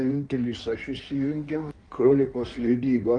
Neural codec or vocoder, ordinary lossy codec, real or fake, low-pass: codec, 16 kHz in and 24 kHz out, 1.1 kbps, FireRedTTS-2 codec; Opus, 32 kbps; fake; 9.9 kHz